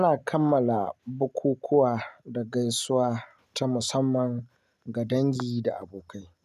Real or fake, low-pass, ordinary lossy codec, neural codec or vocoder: real; 14.4 kHz; none; none